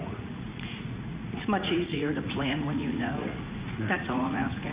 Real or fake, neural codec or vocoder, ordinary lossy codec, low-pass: fake; vocoder, 44.1 kHz, 80 mel bands, Vocos; Opus, 64 kbps; 3.6 kHz